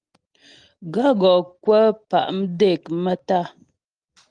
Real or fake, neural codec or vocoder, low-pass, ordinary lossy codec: real; none; 9.9 kHz; Opus, 32 kbps